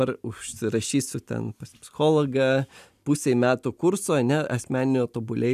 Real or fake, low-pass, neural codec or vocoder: real; 14.4 kHz; none